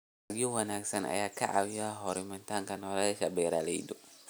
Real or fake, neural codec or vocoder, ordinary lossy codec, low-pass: real; none; none; none